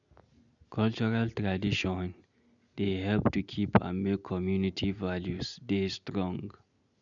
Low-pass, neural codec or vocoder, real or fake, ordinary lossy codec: 7.2 kHz; none; real; none